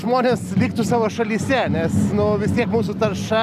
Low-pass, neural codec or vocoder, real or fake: 14.4 kHz; none; real